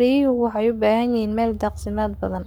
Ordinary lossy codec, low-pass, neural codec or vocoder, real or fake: none; none; codec, 44.1 kHz, 7.8 kbps, Pupu-Codec; fake